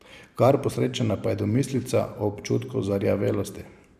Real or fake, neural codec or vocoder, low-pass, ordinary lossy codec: fake; vocoder, 44.1 kHz, 128 mel bands every 512 samples, BigVGAN v2; 14.4 kHz; none